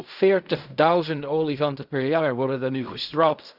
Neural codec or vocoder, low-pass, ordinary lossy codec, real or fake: codec, 16 kHz in and 24 kHz out, 0.4 kbps, LongCat-Audio-Codec, fine tuned four codebook decoder; 5.4 kHz; none; fake